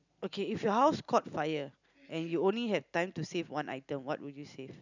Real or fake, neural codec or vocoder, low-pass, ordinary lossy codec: real; none; 7.2 kHz; none